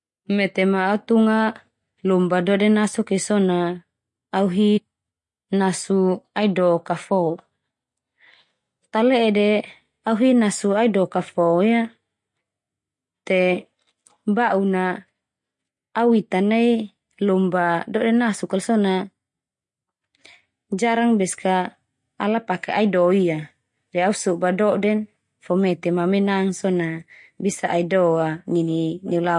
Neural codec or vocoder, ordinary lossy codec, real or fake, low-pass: none; MP3, 48 kbps; real; 10.8 kHz